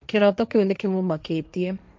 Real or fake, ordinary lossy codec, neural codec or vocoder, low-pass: fake; none; codec, 16 kHz, 1.1 kbps, Voila-Tokenizer; none